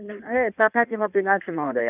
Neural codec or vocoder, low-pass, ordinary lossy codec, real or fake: codec, 16 kHz in and 24 kHz out, 1.1 kbps, FireRedTTS-2 codec; 3.6 kHz; none; fake